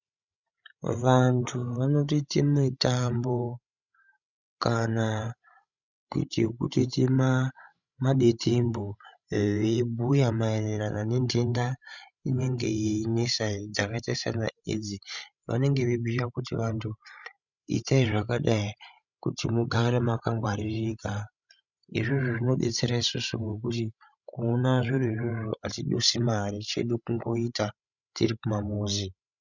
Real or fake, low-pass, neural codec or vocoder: fake; 7.2 kHz; codec, 16 kHz, 16 kbps, FreqCodec, larger model